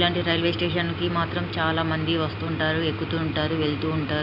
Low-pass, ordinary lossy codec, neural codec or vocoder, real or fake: 5.4 kHz; none; none; real